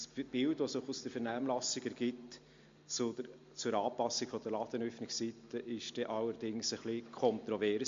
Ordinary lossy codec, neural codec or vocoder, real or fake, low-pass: MP3, 48 kbps; none; real; 7.2 kHz